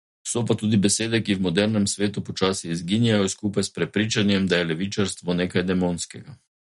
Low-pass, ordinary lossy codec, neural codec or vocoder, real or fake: 19.8 kHz; MP3, 48 kbps; none; real